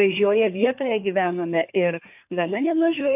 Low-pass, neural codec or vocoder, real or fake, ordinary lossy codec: 3.6 kHz; codec, 24 kHz, 1 kbps, SNAC; fake; AAC, 32 kbps